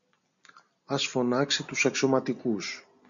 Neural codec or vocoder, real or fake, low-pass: none; real; 7.2 kHz